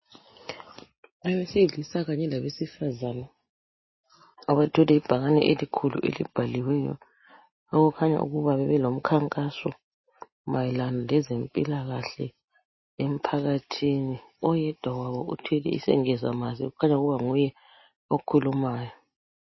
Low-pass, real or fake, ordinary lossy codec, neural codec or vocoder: 7.2 kHz; real; MP3, 24 kbps; none